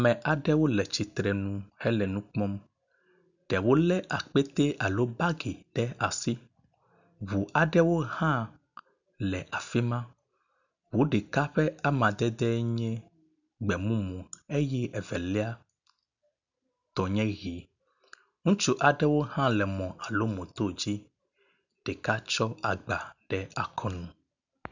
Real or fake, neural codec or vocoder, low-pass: real; none; 7.2 kHz